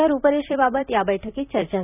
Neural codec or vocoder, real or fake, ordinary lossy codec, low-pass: vocoder, 44.1 kHz, 128 mel bands every 256 samples, BigVGAN v2; fake; none; 3.6 kHz